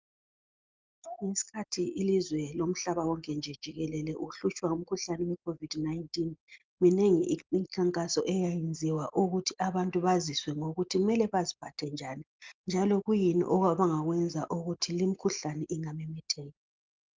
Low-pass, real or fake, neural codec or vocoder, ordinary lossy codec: 7.2 kHz; real; none; Opus, 24 kbps